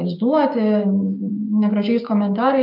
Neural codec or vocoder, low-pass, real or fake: codec, 16 kHz, 6 kbps, DAC; 5.4 kHz; fake